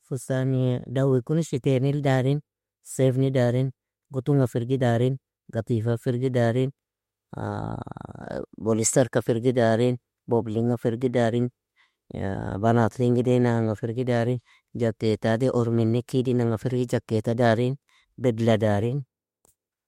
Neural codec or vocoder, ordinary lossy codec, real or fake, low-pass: autoencoder, 48 kHz, 32 numbers a frame, DAC-VAE, trained on Japanese speech; MP3, 64 kbps; fake; 19.8 kHz